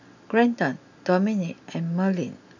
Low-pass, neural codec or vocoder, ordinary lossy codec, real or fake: 7.2 kHz; none; none; real